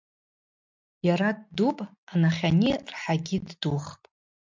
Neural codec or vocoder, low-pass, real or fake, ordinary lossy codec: none; 7.2 kHz; real; MP3, 64 kbps